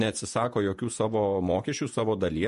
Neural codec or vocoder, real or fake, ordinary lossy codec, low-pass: vocoder, 48 kHz, 128 mel bands, Vocos; fake; MP3, 48 kbps; 14.4 kHz